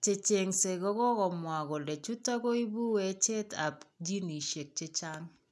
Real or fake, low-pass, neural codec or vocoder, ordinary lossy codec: real; none; none; none